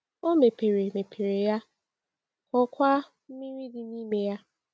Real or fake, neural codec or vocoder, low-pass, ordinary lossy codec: real; none; none; none